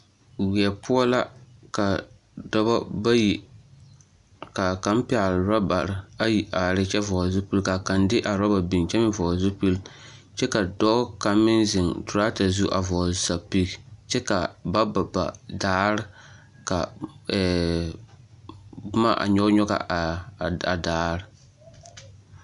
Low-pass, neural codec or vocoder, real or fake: 10.8 kHz; none; real